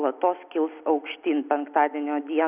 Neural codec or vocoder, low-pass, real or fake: none; 3.6 kHz; real